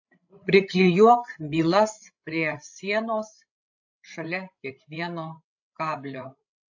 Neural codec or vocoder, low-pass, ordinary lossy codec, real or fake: codec, 16 kHz, 16 kbps, FreqCodec, larger model; 7.2 kHz; AAC, 48 kbps; fake